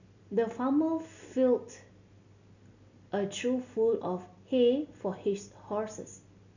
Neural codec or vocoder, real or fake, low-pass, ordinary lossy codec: none; real; 7.2 kHz; none